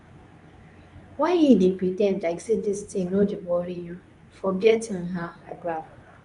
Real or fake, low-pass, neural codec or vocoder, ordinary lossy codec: fake; 10.8 kHz; codec, 24 kHz, 0.9 kbps, WavTokenizer, medium speech release version 2; none